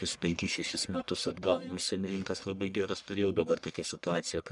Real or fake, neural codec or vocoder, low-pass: fake; codec, 44.1 kHz, 1.7 kbps, Pupu-Codec; 10.8 kHz